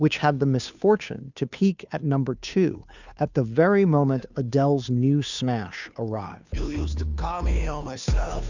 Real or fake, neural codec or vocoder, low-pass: fake; codec, 16 kHz, 2 kbps, FunCodec, trained on Chinese and English, 25 frames a second; 7.2 kHz